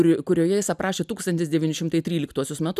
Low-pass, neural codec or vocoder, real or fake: 14.4 kHz; none; real